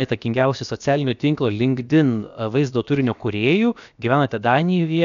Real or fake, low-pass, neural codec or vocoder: fake; 7.2 kHz; codec, 16 kHz, about 1 kbps, DyCAST, with the encoder's durations